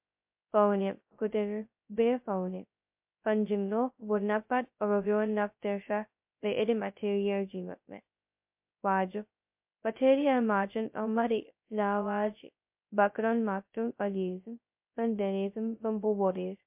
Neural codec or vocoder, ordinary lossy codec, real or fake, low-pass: codec, 16 kHz, 0.2 kbps, FocalCodec; MP3, 32 kbps; fake; 3.6 kHz